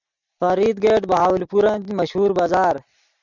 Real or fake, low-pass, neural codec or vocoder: real; 7.2 kHz; none